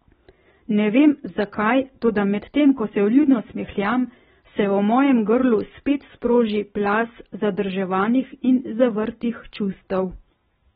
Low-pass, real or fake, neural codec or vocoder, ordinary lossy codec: 7.2 kHz; real; none; AAC, 16 kbps